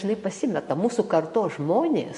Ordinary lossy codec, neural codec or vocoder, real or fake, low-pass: MP3, 48 kbps; none; real; 14.4 kHz